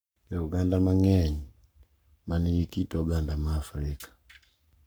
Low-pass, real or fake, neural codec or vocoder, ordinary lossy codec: none; fake; codec, 44.1 kHz, 7.8 kbps, Pupu-Codec; none